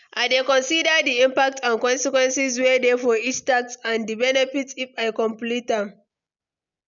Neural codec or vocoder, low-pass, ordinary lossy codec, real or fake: none; 7.2 kHz; none; real